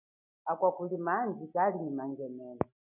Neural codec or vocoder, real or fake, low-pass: none; real; 3.6 kHz